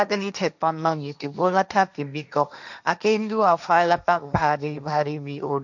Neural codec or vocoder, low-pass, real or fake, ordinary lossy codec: codec, 16 kHz, 1.1 kbps, Voila-Tokenizer; 7.2 kHz; fake; none